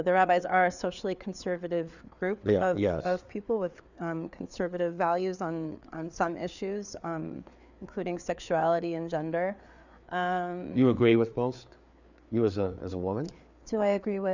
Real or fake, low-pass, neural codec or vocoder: fake; 7.2 kHz; codec, 16 kHz, 4 kbps, FreqCodec, larger model